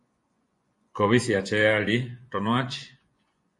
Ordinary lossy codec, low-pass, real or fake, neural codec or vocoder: MP3, 48 kbps; 10.8 kHz; fake; vocoder, 24 kHz, 100 mel bands, Vocos